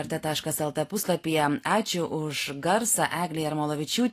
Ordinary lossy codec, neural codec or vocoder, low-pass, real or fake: AAC, 48 kbps; none; 14.4 kHz; real